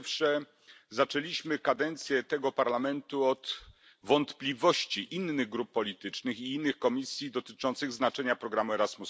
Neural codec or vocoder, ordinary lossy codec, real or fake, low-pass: none; none; real; none